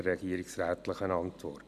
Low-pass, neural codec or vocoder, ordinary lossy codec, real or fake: 14.4 kHz; none; none; real